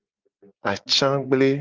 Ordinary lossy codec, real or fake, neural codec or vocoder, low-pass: Opus, 32 kbps; real; none; 7.2 kHz